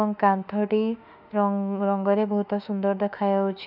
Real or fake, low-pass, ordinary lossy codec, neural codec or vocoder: fake; 5.4 kHz; none; autoencoder, 48 kHz, 32 numbers a frame, DAC-VAE, trained on Japanese speech